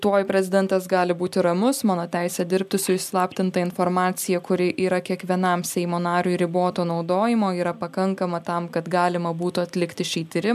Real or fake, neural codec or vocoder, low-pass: real; none; 14.4 kHz